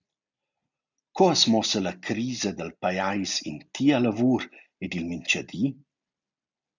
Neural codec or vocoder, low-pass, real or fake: none; 7.2 kHz; real